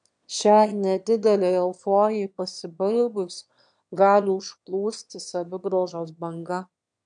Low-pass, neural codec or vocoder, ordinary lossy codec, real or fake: 9.9 kHz; autoencoder, 22.05 kHz, a latent of 192 numbers a frame, VITS, trained on one speaker; MP3, 96 kbps; fake